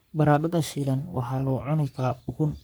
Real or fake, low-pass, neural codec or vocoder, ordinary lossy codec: fake; none; codec, 44.1 kHz, 3.4 kbps, Pupu-Codec; none